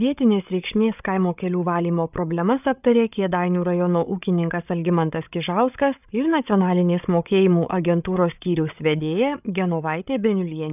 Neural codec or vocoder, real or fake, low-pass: codec, 16 kHz, 8 kbps, FreqCodec, larger model; fake; 3.6 kHz